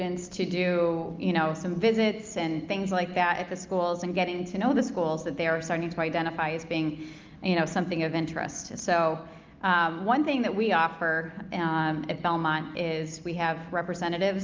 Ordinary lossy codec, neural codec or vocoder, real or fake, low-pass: Opus, 24 kbps; none; real; 7.2 kHz